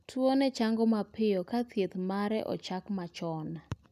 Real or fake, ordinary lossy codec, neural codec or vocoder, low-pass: real; none; none; 14.4 kHz